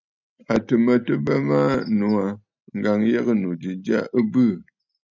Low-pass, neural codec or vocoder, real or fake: 7.2 kHz; none; real